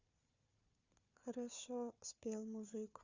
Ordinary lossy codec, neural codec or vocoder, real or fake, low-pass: Opus, 64 kbps; codec, 16 kHz, 4 kbps, FunCodec, trained on Chinese and English, 50 frames a second; fake; 7.2 kHz